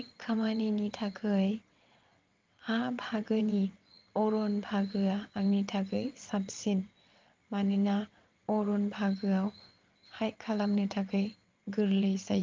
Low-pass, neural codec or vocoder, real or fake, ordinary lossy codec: 7.2 kHz; vocoder, 22.05 kHz, 80 mel bands, WaveNeXt; fake; Opus, 32 kbps